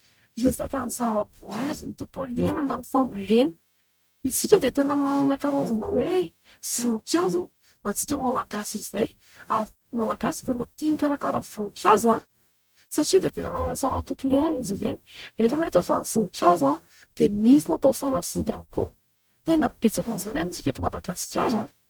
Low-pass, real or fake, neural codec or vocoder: 19.8 kHz; fake; codec, 44.1 kHz, 0.9 kbps, DAC